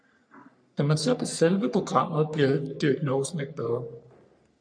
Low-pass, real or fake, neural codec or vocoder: 9.9 kHz; fake; codec, 44.1 kHz, 3.4 kbps, Pupu-Codec